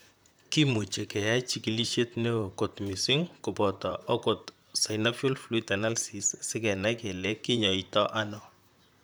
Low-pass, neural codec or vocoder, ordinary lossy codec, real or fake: none; vocoder, 44.1 kHz, 128 mel bands, Pupu-Vocoder; none; fake